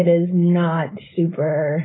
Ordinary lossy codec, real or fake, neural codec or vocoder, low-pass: AAC, 16 kbps; fake; codec, 16 kHz, 4.8 kbps, FACodec; 7.2 kHz